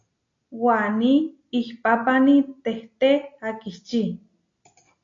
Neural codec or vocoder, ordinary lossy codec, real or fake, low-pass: none; AAC, 48 kbps; real; 7.2 kHz